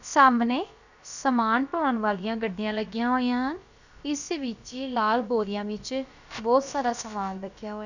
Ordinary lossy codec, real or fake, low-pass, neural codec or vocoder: none; fake; 7.2 kHz; codec, 16 kHz, about 1 kbps, DyCAST, with the encoder's durations